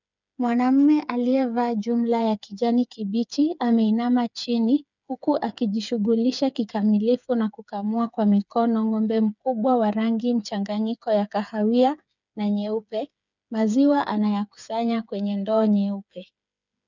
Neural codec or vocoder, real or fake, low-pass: codec, 16 kHz, 8 kbps, FreqCodec, smaller model; fake; 7.2 kHz